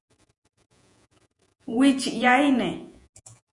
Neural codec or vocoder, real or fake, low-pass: vocoder, 48 kHz, 128 mel bands, Vocos; fake; 10.8 kHz